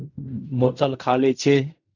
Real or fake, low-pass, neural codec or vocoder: fake; 7.2 kHz; codec, 16 kHz in and 24 kHz out, 0.4 kbps, LongCat-Audio-Codec, fine tuned four codebook decoder